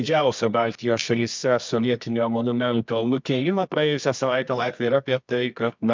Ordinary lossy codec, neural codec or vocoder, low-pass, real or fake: MP3, 64 kbps; codec, 24 kHz, 0.9 kbps, WavTokenizer, medium music audio release; 7.2 kHz; fake